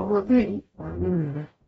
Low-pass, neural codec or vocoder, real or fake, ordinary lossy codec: 19.8 kHz; codec, 44.1 kHz, 0.9 kbps, DAC; fake; AAC, 24 kbps